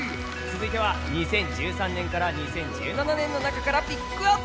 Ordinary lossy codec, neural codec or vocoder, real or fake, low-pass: none; none; real; none